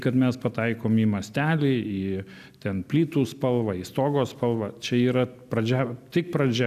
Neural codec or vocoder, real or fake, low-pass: none; real; 14.4 kHz